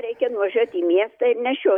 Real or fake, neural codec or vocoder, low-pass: real; none; 19.8 kHz